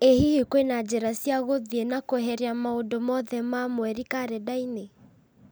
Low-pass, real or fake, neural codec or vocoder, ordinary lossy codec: none; real; none; none